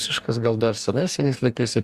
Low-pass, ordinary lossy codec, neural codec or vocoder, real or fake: 14.4 kHz; Opus, 64 kbps; codec, 44.1 kHz, 2.6 kbps, DAC; fake